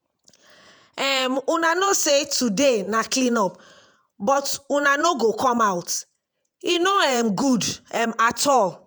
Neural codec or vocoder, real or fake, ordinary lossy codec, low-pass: vocoder, 48 kHz, 128 mel bands, Vocos; fake; none; none